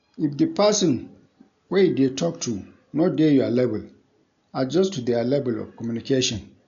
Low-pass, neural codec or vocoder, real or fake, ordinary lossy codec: 7.2 kHz; none; real; none